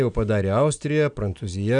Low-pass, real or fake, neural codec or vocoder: 9.9 kHz; real; none